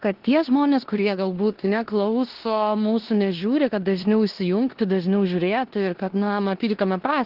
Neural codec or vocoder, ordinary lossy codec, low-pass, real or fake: codec, 16 kHz in and 24 kHz out, 0.9 kbps, LongCat-Audio-Codec, four codebook decoder; Opus, 16 kbps; 5.4 kHz; fake